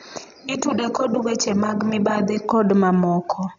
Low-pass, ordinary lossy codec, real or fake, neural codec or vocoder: 7.2 kHz; none; real; none